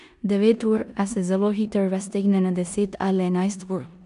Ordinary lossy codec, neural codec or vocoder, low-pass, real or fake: none; codec, 16 kHz in and 24 kHz out, 0.9 kbps, LongCat-Audio-Codec, four codebook decoder; 10.8 kHz; fake